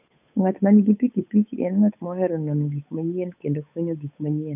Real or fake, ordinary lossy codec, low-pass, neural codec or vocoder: fake; none; 3.6 kHz; codec, 16 kHz, 8 kbps, FunCodec, trained on Chinese and English, 25 frames a second